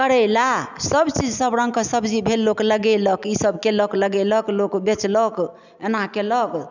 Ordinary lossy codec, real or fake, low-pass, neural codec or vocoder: none; real; 7.2 kHz; none